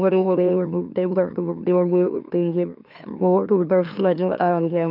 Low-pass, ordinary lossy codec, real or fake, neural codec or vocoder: 5.4 kHz; none; fake; autoencoder, 44.1 kHz, a latent of 192 numbers a frame, MeloTTS